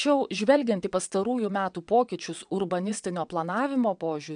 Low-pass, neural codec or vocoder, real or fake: 9.9 kHz; vocoder, 22.05 kHz, 80 mel bands, WaveNeXt; fake